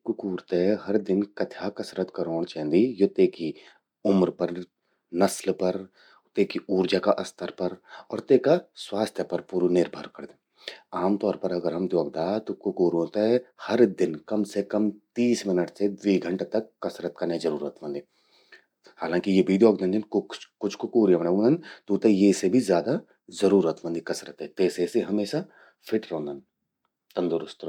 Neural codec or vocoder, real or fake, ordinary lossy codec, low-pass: none; real; none; 19.8 kHz